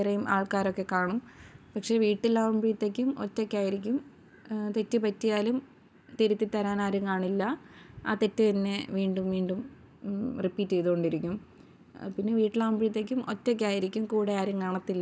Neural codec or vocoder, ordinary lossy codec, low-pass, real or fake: none; none; none; real